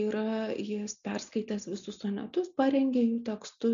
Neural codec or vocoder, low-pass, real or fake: none; 7.2 kHz; real